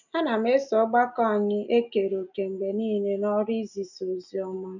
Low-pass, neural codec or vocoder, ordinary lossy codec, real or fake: 7.2 kHz; none; none; real